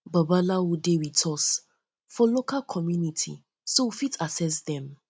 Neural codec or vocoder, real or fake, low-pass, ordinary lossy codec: none; real; none; none